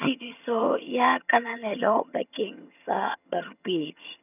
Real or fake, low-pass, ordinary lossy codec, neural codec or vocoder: fake; 3.6 kHz; none; vocoder, 22.05 kHz, 80 mel bands, HiFi-GAN